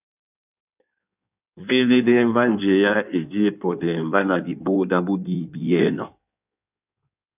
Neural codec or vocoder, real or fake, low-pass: codec, 16 kHz in and 24 kHz out, 1.1 kbps, FireRedTTS-2 codec; fake; 3.6 kHz